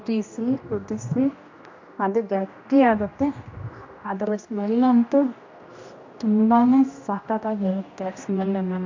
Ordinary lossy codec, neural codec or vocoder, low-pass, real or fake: MP3, 48 kbps; codec, 16 kHz, 1 kbps, X-Codec, HuBERT features, trained on general audio; 7.2 kHz; fake